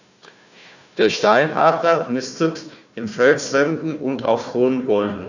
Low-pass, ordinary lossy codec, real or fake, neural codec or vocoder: 7.2 kHz; none; fake; codec, 16 kHz, 1 kbps, FunCodec, trained on Chinese and English, 50 frames a second